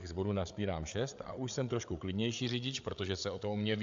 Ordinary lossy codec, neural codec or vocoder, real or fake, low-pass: AAC, 64 kbps; codec, 16 kHz, 8 kbps, FreqCodec, larger model; fake; 7.2 kHz